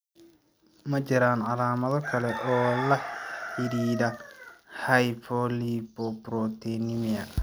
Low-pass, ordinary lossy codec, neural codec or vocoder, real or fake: none; none; none; real